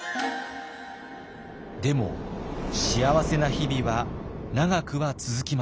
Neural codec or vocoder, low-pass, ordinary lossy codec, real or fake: none; none; none; real